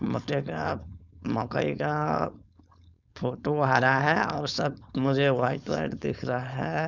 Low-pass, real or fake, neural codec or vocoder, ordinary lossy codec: 7.2 kHz; fake; codec, 16 kHz, 4.8 kbps, FACodec; none